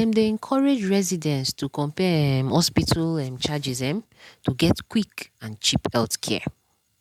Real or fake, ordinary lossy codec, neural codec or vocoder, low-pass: real; MP3, 96 kbps; none; 19.8 kHz